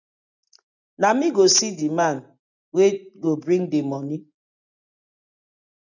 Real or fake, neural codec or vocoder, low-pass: real; none; 7.2 kHz